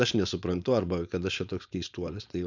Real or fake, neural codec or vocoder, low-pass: fake; codec, 16 kHz, 4.8 kbps, FACodec; 7.2 kHz